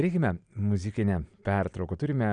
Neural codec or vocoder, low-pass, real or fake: none; 9.9 kHz; real